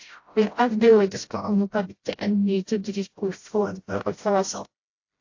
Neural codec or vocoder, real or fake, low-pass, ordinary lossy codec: codec, 16 kHz, 0.5 kbps, FreqCodec, smaller model; fake; 7.2 kHz; AAC, 48 kbps